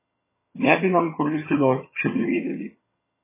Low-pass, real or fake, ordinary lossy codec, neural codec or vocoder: 3.6 kHz; fake; MP3, 16 kbps; vocoder, 22.05 kHz, 80 mel bands, HiFi-GAN